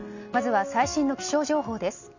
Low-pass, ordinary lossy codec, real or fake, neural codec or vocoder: 7.2 kHz; none; real; none